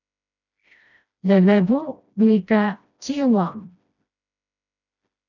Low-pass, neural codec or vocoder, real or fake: 7.2 kHz; codec, 16 kHz, 1 kbps, FreqCodec, smaller model; fake